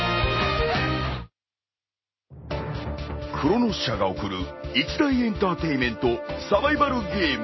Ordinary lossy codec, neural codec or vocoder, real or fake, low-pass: MP3, 24 kbps; none; real; 7.2 kHz